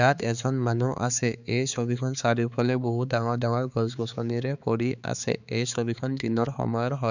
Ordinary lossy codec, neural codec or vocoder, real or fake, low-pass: none; codec, 16 kHz, 4 kbps, X-Codec, HuBERT features, trained on balanced general audio; fake; 7.2 kHz